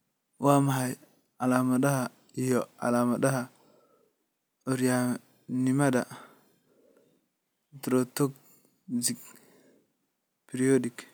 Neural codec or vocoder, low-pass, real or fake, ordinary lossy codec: none; none; real; none